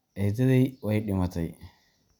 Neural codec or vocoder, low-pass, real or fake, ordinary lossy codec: none; 19.8 kHz; real; none